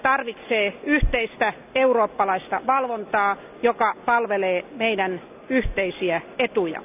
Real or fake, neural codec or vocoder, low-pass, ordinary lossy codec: real; none; 3.6 kHz; none